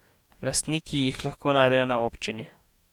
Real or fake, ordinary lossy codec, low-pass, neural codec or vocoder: fake; none; 19.8 kHz; codec, 44.1 kHz, 2.6 kbps, DAC